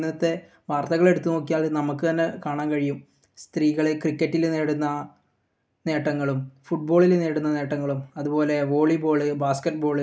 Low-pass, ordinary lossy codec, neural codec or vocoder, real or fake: none; none; none; real